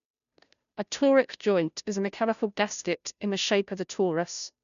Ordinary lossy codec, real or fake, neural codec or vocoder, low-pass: none; fake; codec, 16 kHz, 0.5 kbps, FunCodec, trained on Chinese and English, 25 frames a second; 7.2 kHz